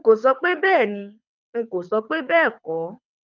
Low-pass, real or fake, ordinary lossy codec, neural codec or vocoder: 7.2 kHz; fake; none; codec, 24 kHz, 6 kbps, HILCodec